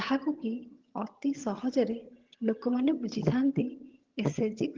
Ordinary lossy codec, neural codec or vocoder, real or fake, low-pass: Opus, 16 kbps; vocoder, 22.05 kHz, 80 mel bands, HiFi-GAN; fake; 7.2 kHz